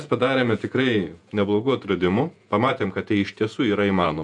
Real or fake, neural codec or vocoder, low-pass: fake; vocoder, 48 kHz, 128 mel bands, Vocos; 10.8 kHz